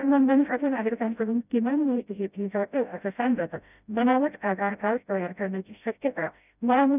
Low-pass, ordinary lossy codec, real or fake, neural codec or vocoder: 3.6 kHz; none; fake; codec, 16 kHz, 0.5 kbps, FreqCodec, smaller model